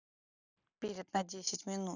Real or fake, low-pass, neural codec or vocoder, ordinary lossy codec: real; 7.2 kHz; none; none